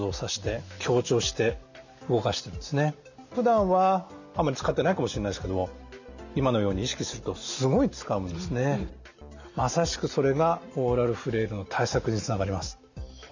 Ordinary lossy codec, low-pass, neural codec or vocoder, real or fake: none; 7.2 kHz; none; real